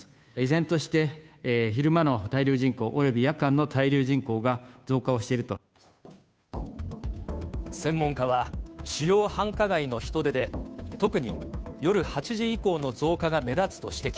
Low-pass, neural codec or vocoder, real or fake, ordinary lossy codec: none; codec, 16 kHz, 2 kbps, FunCodec, trained on Chinese and English, 25 frames a second; fake; none